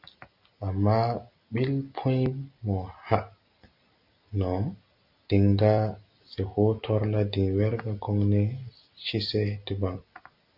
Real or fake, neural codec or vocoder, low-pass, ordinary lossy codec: real; none; 5.4 kHz; Opus, 64 kbps